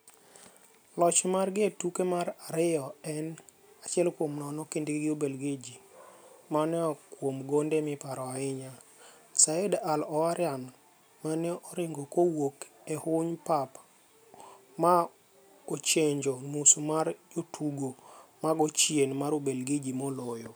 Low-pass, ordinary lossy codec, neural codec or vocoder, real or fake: none; none; none; real